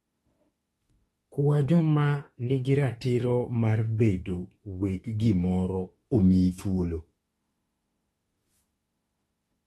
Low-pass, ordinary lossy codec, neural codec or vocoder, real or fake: 19.8 kHz; AAC, 32 kbps; autoencoder, 48 kHz, 32 numbers a frame, DAC-VAE, trained on Japanese speech; fake